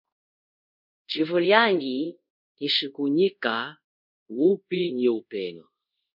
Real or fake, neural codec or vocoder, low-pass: fake; codec, 24 kHz, 0.5 kbps, DualCodec; 5.4 kHz